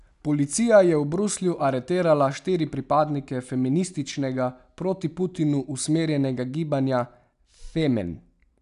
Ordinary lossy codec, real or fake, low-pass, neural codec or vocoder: none; real; 10.8 kHz; none